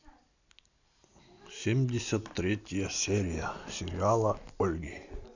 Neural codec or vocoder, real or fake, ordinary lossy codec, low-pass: none; real; none; 7.2 kHz